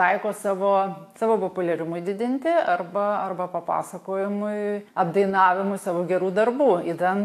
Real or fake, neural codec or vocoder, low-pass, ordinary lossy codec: fake; autoencoder, 48 kHz, 128 numbers a frame, DAC-VAE, trained on Japanese speech; 14.4 kHz; AAC, 64 kbps